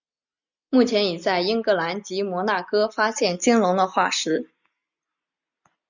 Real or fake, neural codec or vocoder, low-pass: real; none; 7.2 kHz